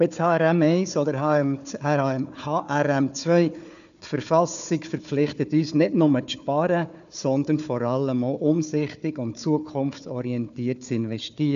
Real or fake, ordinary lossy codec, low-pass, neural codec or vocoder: fake; none; 7.2 kHz; codec, 16 kHz, 4 kbps, FunCodec, trained on Chinese and English, 50 frames a second